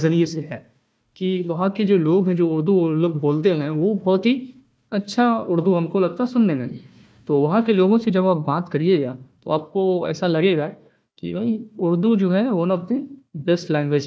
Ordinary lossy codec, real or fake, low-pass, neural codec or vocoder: none; fake; none; codec, 16 kHz, 1 kbps, FunCodec, trained on Chinese and English, 50 frames a second